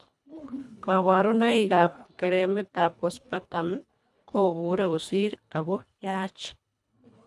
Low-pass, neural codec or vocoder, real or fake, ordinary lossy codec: none; codec, 24 kHz, 1.5 kbps, HILCodec; fake; none